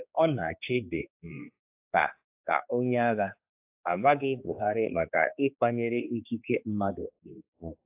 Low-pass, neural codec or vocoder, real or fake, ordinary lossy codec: 3.6 kHz; codec, 16 kHz, 2 kbps, X-Codec, HuBERT features, trained on general audio; fake; AAC, 32 kbps